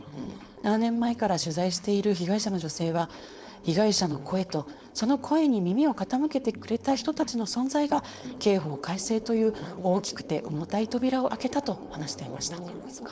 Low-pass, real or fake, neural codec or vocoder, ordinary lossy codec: none; fake; codec, 16 kHz, 4.8 kbps, FACodec; none